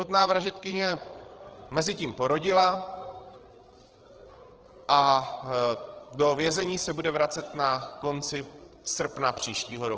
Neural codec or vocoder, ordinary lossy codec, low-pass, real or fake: codec, 16 kHz, 8 kbps, FreqCodec, larger model; Opus, 16 kbps; 7.2 kHz; fake